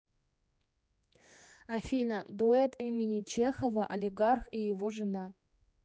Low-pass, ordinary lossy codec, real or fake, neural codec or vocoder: none; none; fake; codec, 16 kHz, 2 kbps, X-Codec, HuBERT features, trained on general audio